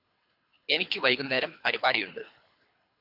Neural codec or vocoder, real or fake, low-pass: codec, 24 kHz, 3 kbps, HILCodec; fake; 5.4 kHz